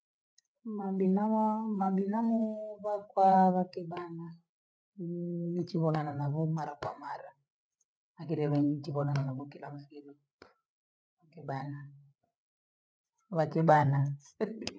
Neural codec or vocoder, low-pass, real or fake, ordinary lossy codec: codec, 16 kHz, 8 kbps, FreqCodec, larger model; none; fake; none